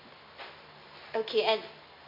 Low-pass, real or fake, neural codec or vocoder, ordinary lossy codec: 5.4 kHz; real; none; MP3, 32 kbps